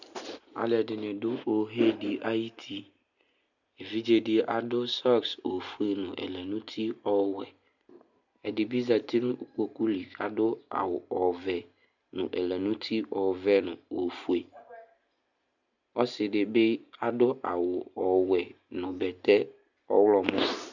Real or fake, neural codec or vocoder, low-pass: real; none; 7.2 kHz